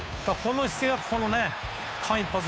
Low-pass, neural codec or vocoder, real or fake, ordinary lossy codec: none; codec, 16 kHz, 2 kbps, FunCodec, trained on Chinese and English, 25 frames a second; fake; none